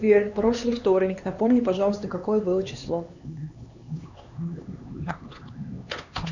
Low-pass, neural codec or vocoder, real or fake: 7.2 kHz; codec, 16 kHz, 2 kbps, X-Codec, HuBERT features, trained on LibriSpeech; fake